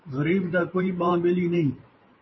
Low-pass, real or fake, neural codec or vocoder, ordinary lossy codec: 7.2 kHz; fake; vocoder, 44.1 kHz, 128 mel bands every 512 samples, BigVGAN v2; MP3, 24 kbps